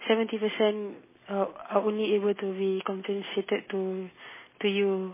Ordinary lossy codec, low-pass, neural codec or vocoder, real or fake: MP3, 16 kbps; 3.6 kHz; none; real